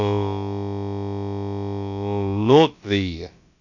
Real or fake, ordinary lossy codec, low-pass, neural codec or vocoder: fake; none; 7.2 kHz; codec, 16 kHz, about 1 kbps, DyCAST, with the encoder's durations